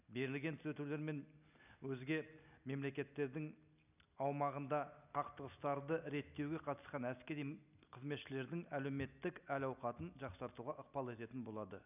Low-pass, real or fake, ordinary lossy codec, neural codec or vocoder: 3.6 kHz; real; none; none